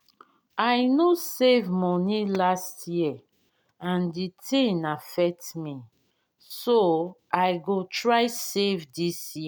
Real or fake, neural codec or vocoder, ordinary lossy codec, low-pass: real; none; none; none